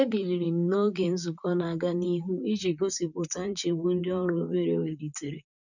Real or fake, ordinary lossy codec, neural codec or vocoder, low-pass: fake; none; vocoder, 44.1 kHz, 128 mel bands, Pupu-Vocoder; 7.2 kHz